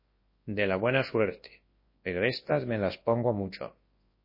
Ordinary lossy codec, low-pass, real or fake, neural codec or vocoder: MP3, 24 kbps; 5.4 kHz; fake; codec, 24 kHz, 0.9 kbps, WavTokenizer, large speech release